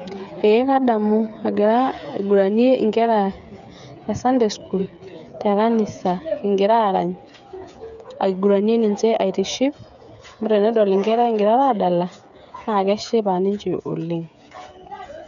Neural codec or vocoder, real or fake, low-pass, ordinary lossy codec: codec, 16 kHz, 8 kbps, FreqCodec, smaller model; fake; 7.2 kHz; none